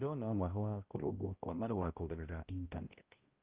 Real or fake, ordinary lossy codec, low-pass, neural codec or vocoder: fake; Opus, 32 kbps; 3.6 kHz; codec, 16 kHz, 0.5 kbps, X-Codec, HuBERT features, trained on balanced general audio